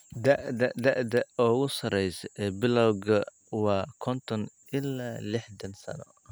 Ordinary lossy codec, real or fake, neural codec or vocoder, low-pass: none; real; none; none